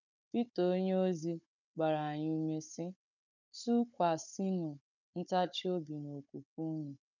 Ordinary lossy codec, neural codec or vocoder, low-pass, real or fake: none; codec, 16 kHz, 16 kbps, FunCodec, trained on Chinese and English, 50 frames a second; 7.2 kHz; fake